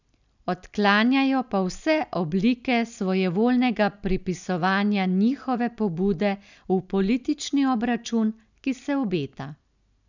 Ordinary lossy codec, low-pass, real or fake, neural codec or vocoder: none; 7.2 kHz; real; none